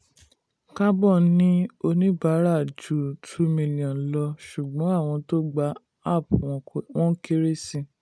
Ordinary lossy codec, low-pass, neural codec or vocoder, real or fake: none; none; none; real